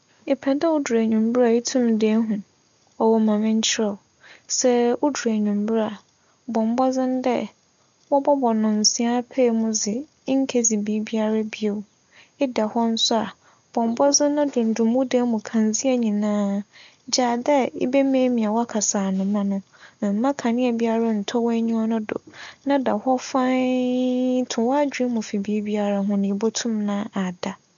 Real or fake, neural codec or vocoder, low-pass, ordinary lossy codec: real; none; 7.2 kHz; none